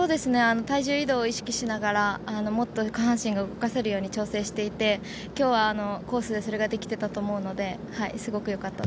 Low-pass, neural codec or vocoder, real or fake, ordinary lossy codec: none; none; real; none